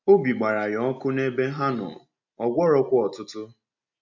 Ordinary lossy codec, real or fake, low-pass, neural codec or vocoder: none; real; 7.2 kHz; none